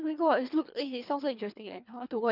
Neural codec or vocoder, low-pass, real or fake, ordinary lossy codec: codec, 24 kHz, 3 kbps, HILCodec; 5.4 kHz; fake; none